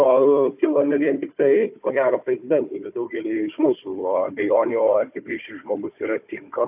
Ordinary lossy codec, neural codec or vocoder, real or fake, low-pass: AAC, 32 kbps; codec, 16 kHz, 4 kbps, FunCodec, trained on Chinese and English, 50 frames a second; fake; 3.6 kHz